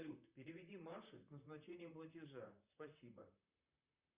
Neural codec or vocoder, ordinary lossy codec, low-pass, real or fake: vocoder, 44.1 kHz, 128 mel bands, Pupu-Vocoder; Opus, 64 kbps; 3.6 kHz; fake